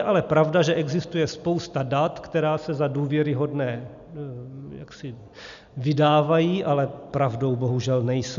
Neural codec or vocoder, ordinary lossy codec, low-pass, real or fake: none; MP3, 96 kbps; 7.2 kHz; real